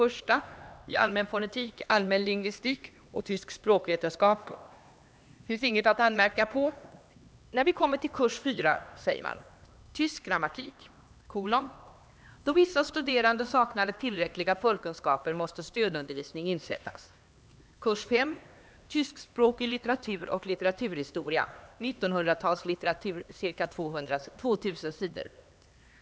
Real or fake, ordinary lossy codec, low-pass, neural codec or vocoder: fake; none; none; codec, 16 kHz, 2 kbps, X-Codec, HuBERT features, trained on LibriSpeech